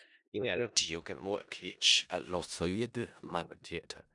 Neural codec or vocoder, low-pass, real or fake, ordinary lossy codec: codec, 16 kHz in and 24 kHz out, 0.4 kbps, LongCat-Audio-Codec, four codebook decoder; 10.8 kHz; fake; none